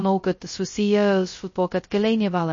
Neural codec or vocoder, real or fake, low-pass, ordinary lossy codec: codec, 16 kHz, 0.2 kbps, FocalCodec; fake; 7.2 kHz; MP3, 32 kbps